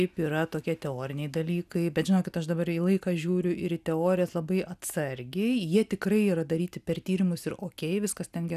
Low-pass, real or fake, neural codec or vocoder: 14.4 kHz; real; none